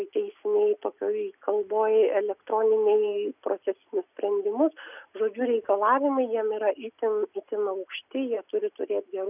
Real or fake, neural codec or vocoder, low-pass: real; none; 3.6 kHz